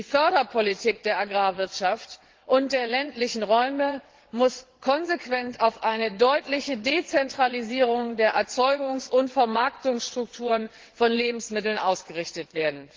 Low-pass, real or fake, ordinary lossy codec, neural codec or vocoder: 7.2 kHz; fake; Opus, 16 kbps; vocoder, 22.05 kHz, 80 mel bands, WaveNeXt